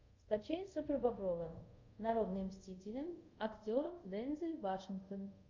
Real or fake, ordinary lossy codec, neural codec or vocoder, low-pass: fake; MP3, 48 kbps; codec, 24 kHz, 0.5 kbps, DualCodec; 7.2 kHz